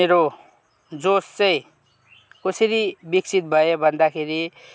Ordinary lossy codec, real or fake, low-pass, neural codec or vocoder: none; real; none; none